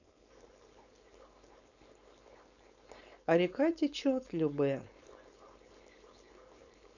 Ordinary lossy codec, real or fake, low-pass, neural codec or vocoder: none; fake; 7.2 kHz; codec, 16 kHz, 4.8 kbps, FACodec